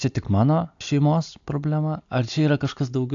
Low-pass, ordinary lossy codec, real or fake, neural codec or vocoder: 7.2 kHz; AAC, 64 kbps; real; none